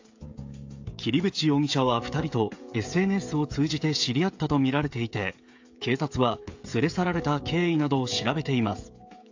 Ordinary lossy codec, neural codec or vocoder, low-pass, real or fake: AAC, 48 kbps; codec, 16 kHz, 16 kbps, FreqCodec, smaller model; 7.2 kHz; fake